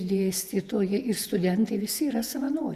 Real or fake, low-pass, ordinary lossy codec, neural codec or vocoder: fake; 14.4 kHz; Opus, 64 kbps; vocoder, 48 kHz, 128 mel bands, Vocos